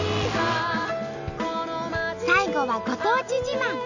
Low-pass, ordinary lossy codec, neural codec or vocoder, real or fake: 7.2 kHz; none; none; real